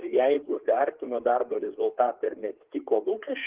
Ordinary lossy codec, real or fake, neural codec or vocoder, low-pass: Opus, 16 kbps; fake; codec, 16 kHz, 4 kbps, FreqCodec, larger model; 3.6 kHz